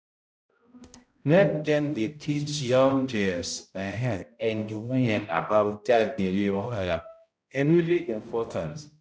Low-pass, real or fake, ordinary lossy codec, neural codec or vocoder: none; fake; none; codec, 16 kHz, 0.5 kbps, X-Codec, HuBERT features, trained on balanced general audio